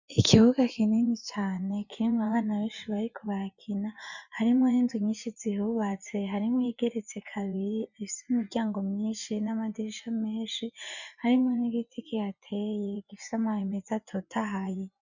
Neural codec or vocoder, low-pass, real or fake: vocoder, 24 kHz, 100 mel bands, Vocos; 7.2 kHz; fake